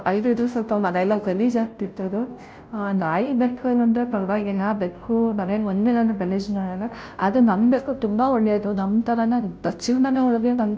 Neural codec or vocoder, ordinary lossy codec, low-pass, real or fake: codec, 16 kHz, 0.5 kbps, FunCodec, trained on Chinese and English, 25 frames a second; none; none; fake